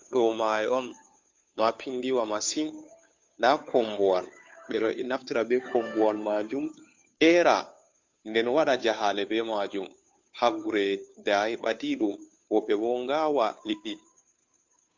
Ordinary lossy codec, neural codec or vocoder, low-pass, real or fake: MP3, 64 kbps; codec, 16 kHz, 2 kbps, FunCodec, trained on Chinese and English, 25 frames a second; 7.2 kHz; fake